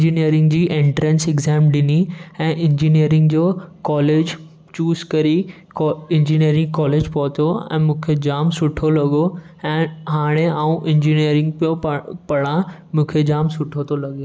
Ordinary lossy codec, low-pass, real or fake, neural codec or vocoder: none; none; real; none